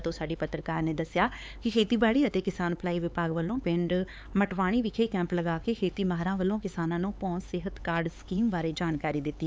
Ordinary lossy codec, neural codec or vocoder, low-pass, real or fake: none; codec, 16 kHz, 4 kbps, X-Codec, HuBERT features, trained on LibriSpeech; none; fake